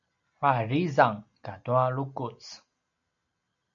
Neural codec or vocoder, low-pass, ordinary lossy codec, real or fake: none; 7.2 kHz; MP3, 64 kbps; real